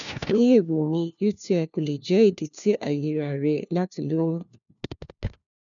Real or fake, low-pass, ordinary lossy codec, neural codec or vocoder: fake; 7.2 kHz; none; codec, 16 kHz, 1 kbps, FunCodec, trained on LibriTTS, 50 frames a second